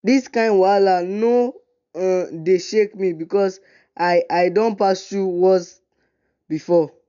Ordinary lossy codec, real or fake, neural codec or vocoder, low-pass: none; real; none; 7.2 kHz